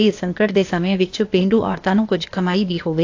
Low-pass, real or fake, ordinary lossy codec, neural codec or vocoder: 7.2 kHz; fake; AAC, 48 kbps; codec, 16 kHz, 0.8 kbps, ZipCodec